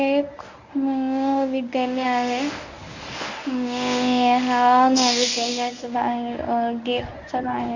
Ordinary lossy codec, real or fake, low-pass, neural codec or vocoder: none; fake; 7.2 kHz; codec, 24 kHz, 0.9 kbps, WavTokenizer, medium speech release version 1